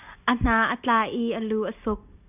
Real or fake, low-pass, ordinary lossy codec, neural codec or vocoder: real; 3.6 kHz; AAC, 32 kbps; none